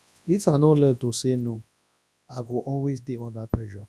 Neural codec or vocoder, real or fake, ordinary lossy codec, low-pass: codec, 24 kHz, 0.9 kbps, WavTokenizer, large speech release; fake; none; none